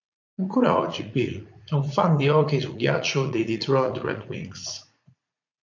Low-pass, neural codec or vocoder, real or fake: 7.2 kHz; vocoder, 44.1 kHz, 80 mel bands, Vocos; fake